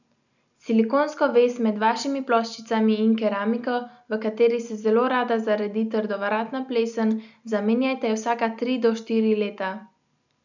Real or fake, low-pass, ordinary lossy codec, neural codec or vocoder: real; 7.2 kHz; none; none